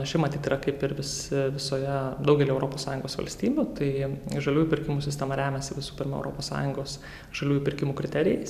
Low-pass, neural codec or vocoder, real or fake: 14.4 kHz; none; real